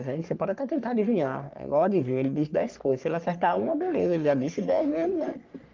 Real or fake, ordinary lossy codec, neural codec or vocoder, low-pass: fake; Opus, 32 kbps; codec, 44.1 kHz, 3.4 kbps, Pupu-Codec; 7.2 kHz